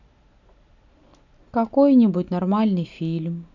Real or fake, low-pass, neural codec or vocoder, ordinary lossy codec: real; 7.2 kHz; none; none